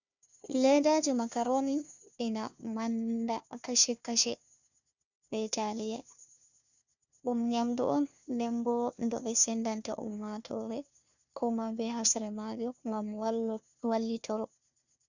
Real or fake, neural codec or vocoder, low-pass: fake; codec, 16 kHz, 1 kbps, FunCodec, trained on Chinese and English, 50 frames a second; 7.2 kHz